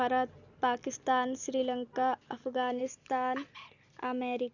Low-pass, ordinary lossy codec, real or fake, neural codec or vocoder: 7.2 kHz; none; real; none